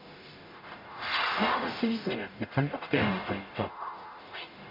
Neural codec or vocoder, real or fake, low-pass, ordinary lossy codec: codec, 44.1 kHz, 0.9 kbps, DAC; fake; 5.4 kHz; MP3, 48 kbps